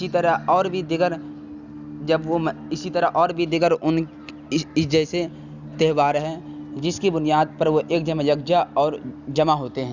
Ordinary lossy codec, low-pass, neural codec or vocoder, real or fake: none; 7.2 kHz; none; real